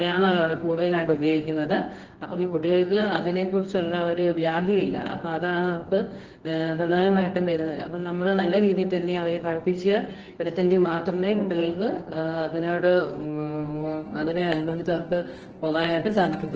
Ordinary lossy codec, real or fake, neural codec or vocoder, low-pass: Opus, 16 kbps; fake; codec, 24 kHz, 0.9 kbps, WavTokenizer, medium music audio release; 7.2 kHz